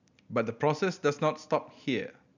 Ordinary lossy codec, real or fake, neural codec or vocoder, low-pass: none; real; none; 7.2 kHz